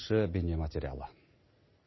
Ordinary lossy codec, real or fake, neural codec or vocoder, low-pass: MP3, 24 kbps; real; none; 7.2 kHz